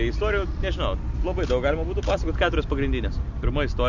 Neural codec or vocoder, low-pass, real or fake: none; 7.2 kHz; real